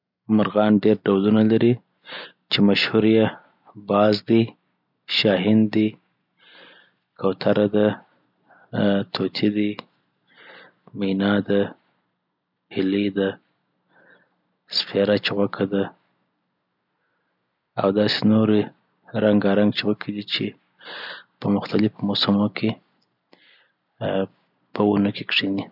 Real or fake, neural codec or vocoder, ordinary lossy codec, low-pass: real; none; none; 5.4 kHz